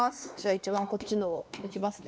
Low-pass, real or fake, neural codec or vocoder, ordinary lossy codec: none; fake; codec, 16 kHz, 2 kbps, X-Codec, WavLM features, trained on Multilingual LibriSpeech; none